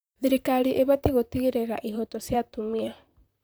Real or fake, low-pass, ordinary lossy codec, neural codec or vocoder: fake; none; none; vocoder, 44.1 kHz, 128 mel bands, Pupu-Vocoder